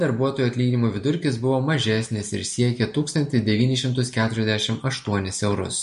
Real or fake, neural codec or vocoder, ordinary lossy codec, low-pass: real; none; MP3, 48 kbps; 14.4 kHz